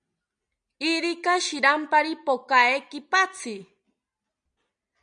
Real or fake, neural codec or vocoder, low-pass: real; none; 9.9 kHz